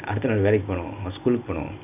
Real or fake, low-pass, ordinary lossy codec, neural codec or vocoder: real; 3.6 kHz; none; none